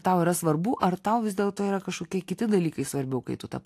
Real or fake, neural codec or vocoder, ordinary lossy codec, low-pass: real; none; AAC, 64 kbps; 14.4 kHz